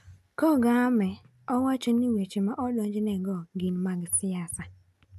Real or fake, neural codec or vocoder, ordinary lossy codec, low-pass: real; none; none; 14.4 kHz